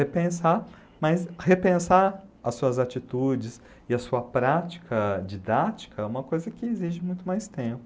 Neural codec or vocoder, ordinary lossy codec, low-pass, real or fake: none; none; none; real